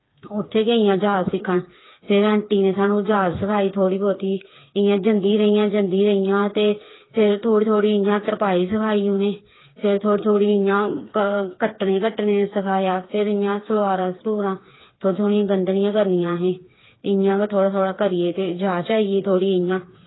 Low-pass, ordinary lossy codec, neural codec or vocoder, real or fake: 7.2 kHz; AAC, 16 kbps; codec, 16 kHz, 4 kbps, FreqCodec, smaller model; fake